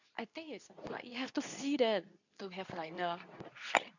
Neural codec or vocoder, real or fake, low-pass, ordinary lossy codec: codec, 24 kHz, 0.9 kbps, WavTokenizer, medium speech release version 2; fake; 7.2 kHz; none